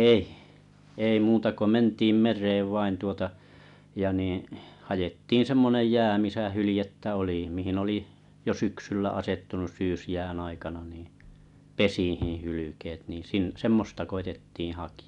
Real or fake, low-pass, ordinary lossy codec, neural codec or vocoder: real; 10.8 kHz; none; none